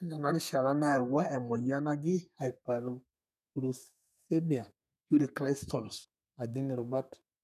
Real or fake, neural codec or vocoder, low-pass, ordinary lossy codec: fake; codec, 32 kHz, 1.9 kbps, SNAC; 14.4 kHz; none